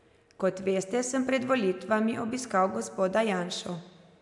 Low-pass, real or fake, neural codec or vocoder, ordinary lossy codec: 10.8 kHz; real; none; none